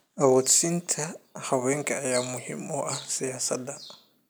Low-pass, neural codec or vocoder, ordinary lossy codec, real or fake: none; none; none; real